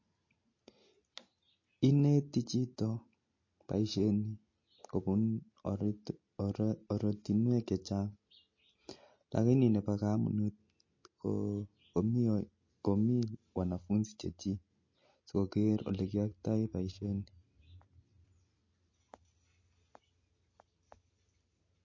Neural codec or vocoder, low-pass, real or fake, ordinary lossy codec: none; 7.2 kHz; real; MP3, 32 kbps